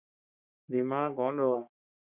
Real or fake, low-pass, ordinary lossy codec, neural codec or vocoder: fake; 3.6 kHz; Opus, 64 kbps; codec, 44.1 kHz, 3.4 kbps, Pupu-Codec